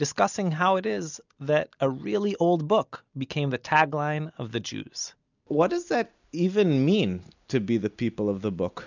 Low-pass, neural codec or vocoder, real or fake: 7.2 kHz; none; real